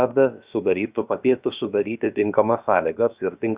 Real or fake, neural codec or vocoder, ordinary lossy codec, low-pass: fake; codec, 16 kHz, about 1 kbps, DyCAST, with the encoder's durations; Opus, 64 kbps; 3.6 kHz